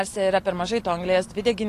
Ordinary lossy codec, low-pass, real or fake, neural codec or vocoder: AAC, 48 kbps; 14.4 kHz; real; none